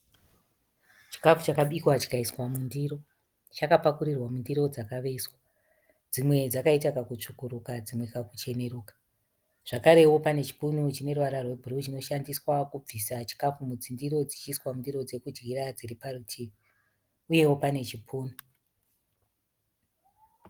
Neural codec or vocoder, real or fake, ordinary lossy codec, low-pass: none; real; Opus, 24 kbps; 19.8 kHz